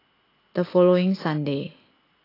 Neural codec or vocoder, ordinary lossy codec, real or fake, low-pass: none; AAC, 24 kbps; real; 5.4 kHz